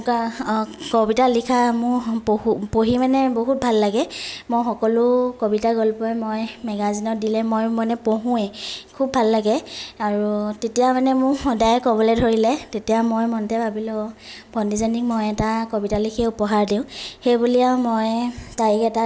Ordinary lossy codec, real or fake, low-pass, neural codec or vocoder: none; real; none; none